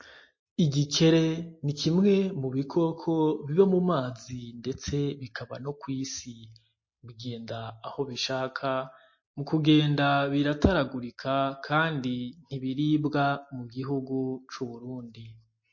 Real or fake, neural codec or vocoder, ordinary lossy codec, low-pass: real; none; MP3, 32 kbps; 7.2 kHz